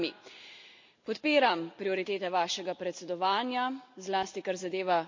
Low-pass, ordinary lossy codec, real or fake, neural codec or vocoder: 7.2 kHz; MP3, 48 kbps; real; none